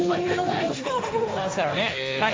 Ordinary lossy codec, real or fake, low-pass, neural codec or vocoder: none; fake; none; codec, 16 kHz, 1.1 kbps, Voila-Tokenizer